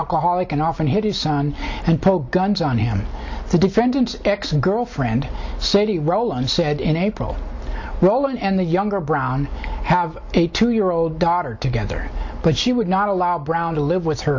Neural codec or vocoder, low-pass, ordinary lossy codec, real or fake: none; 7.2 kHz; MP3, 64 kbps; real